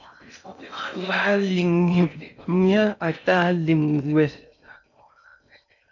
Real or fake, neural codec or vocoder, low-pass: fake; codec, 16 kHz in and 24 kHz out, 0.6 kbps, FocalCodec, streaming, 4096 codes; 7.2 kHz